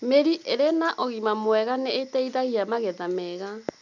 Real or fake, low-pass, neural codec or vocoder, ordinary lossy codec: real; 7.2 kHz; none; none